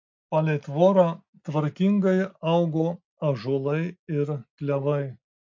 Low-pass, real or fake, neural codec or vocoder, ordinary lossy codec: 7.2 kHz; real; none; MP3, 48 kbps